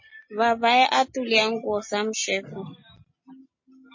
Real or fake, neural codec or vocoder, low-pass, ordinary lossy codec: real; none; 7.2 kHz; MP3, 32 kbps